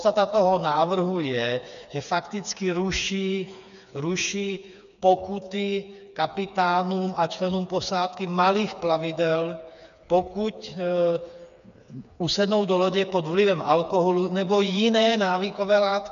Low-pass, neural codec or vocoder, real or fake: 7.2 kHz; codec, 16 kHz, 4 kbps, FreqCodec, smaller model; fake